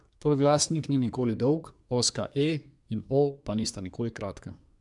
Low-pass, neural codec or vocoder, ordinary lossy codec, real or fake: 10.8 kHz; codec, 24 kHz, 1 kbps, SNAC; none; fake